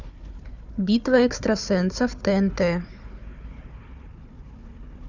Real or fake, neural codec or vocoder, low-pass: fake; codec, 16 kHz, 4 kbps, FunCodec, trained on Chinese and English, 50 frames a second; 7.2 kHz